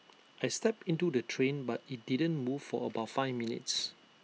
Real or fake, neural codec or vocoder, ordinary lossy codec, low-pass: real; none; none; none